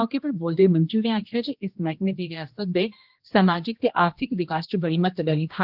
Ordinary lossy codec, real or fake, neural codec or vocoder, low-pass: Opus, 24 kbps; fake; codec, 16 kHz, 1 kbps, X-Codec, HuBERT features, trained on general audio; 5.4 kHz